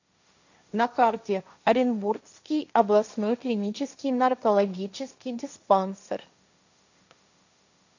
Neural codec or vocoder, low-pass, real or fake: codec, 16 kHz, 1.1 kbps, Voila-Tokenizer; 7.2 kHz; fake